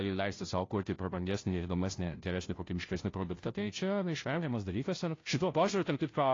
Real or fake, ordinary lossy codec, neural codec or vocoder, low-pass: fake; AAC, 32 kbps; codec, 16 kHz, 0.5 kbps, FunCodec, trained on Chinese and English, 25 frames a second; 7.2 kHz